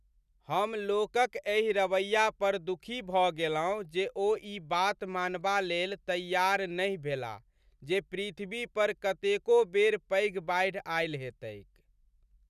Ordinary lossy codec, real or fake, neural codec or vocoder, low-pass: none; real; none; 14.4 kHz